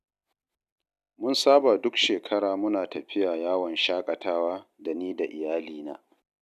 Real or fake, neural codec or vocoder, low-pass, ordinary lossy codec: real; none; 14.4 kHz; none